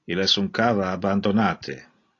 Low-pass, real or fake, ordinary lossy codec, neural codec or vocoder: 7.2 kHz; real; AAC, 32 kbps; none